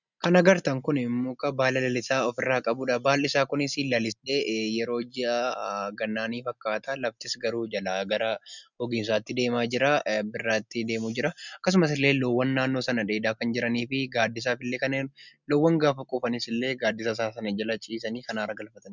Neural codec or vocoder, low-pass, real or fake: none; 7.2 kHz; real